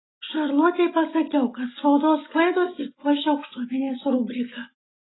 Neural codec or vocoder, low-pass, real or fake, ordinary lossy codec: none; 7.2 kHz; real; AAC, 16 kbps